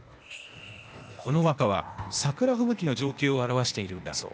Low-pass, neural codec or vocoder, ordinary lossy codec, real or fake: none; codec, 16 kHz, 0.8 kbps, ZipCodec; none; fake